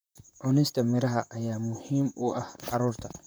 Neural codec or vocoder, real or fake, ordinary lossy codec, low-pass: vocoder, 44.1 kHz, 128 mel bands, Pupu-Vocoder; fake; none; none